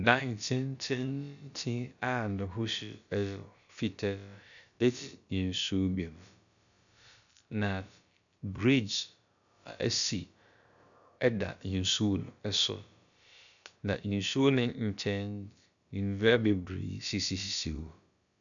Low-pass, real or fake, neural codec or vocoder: 7.2 kHz; fake; codec, 16 kHz, about 1 kbps, DyCAST, with the encoder's durations